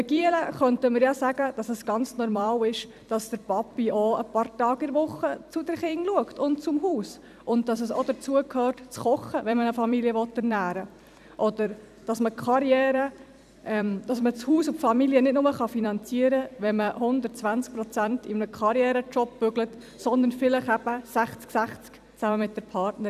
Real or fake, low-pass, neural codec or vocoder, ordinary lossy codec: fake; 14.4 kHz; vocoder, 44.1 kHz, 128 mel bands every 256 samples, BigVGAN v2; none